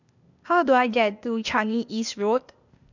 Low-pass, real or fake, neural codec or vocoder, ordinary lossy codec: 7.2 kHz; fake; codec, 16 kHz, 0.8 kbps, ZipCodec; none